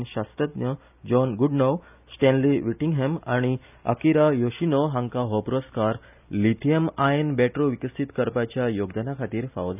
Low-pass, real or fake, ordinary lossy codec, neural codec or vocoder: 3.6 kHz; real; none; none